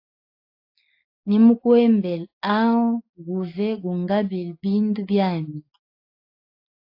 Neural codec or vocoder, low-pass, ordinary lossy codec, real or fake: none; 5.4 kHz; AAC, 32 kbps; real